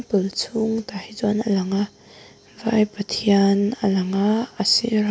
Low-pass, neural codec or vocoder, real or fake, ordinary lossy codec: none; none; real; none